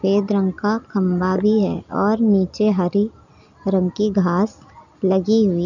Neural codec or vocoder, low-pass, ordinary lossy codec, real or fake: none; 7.2 kHz; none; real